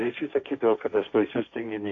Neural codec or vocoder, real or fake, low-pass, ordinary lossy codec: codec, 16 kHz, 1.1 kbps, Voila-Tokenizer; fake; 7.2 kHz; AAC, 48 kbps